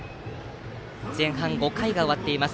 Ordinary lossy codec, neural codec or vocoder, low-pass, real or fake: none; none; none; real